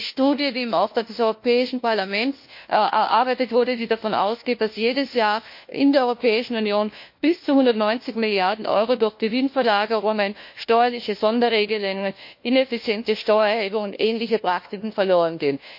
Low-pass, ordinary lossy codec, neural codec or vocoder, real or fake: 5.4 kHz; MP3, 32 kbps; codec, 16 kHz, 1 kbps, FunCodec, trained on LibriTTS, 50 frames a second; fake